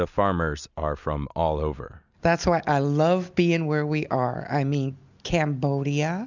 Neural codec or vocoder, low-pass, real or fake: none; 7.2 kHz; real